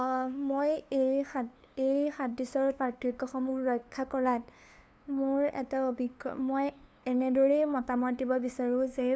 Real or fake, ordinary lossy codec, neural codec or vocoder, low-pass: fake; none; codec, 16 kHz, 2 kbps, FunCodec, trained on LibriTTS, 25 frames a second; none